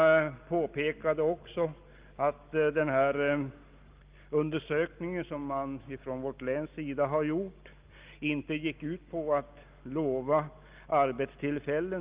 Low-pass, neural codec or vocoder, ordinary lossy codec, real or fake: 3.6 kHz; none; Opus, 32 kbps; real